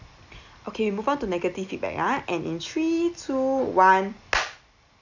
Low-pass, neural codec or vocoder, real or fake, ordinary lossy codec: 7.2 kHz; none; real; none